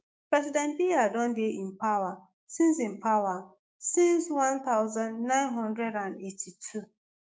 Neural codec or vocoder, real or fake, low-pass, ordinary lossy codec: codec, 16 kHz, 6 kbps, DAC; fake; none; none